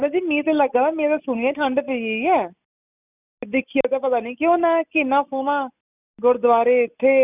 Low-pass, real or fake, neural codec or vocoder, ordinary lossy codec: 3.6 kHz; real; none; none